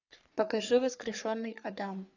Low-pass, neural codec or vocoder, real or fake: 7.2 kHz; codec, 44.1 kHz, 3.4 kbps, Pupu-Codec; fake